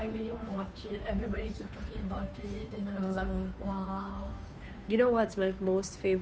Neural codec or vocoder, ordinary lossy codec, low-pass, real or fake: codec, 16 kHz, 2 kbps, FunCodec, trained on Chinese and English, 25 frames a second; none; none; fake